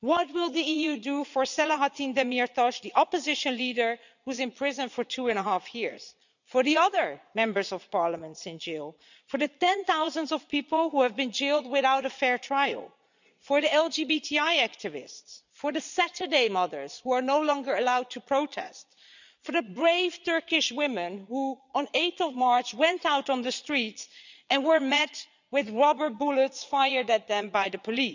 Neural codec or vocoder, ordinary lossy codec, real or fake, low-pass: vocoder, 22.05 kHz, 80 mel bands, Vocos; none; fake; 7.2 kHz